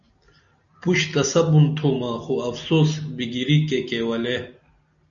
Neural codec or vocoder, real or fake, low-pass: none; real; 7.2 kHz